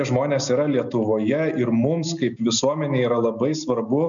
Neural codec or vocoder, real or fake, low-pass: none; real; 7.2 kHz